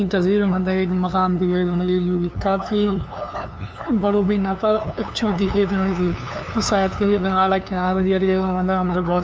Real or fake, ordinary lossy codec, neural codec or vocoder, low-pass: fake; none; codec, 16 kHz, 2 kbps, FunCodec, trained on LibriTTS, 25 frames a second; none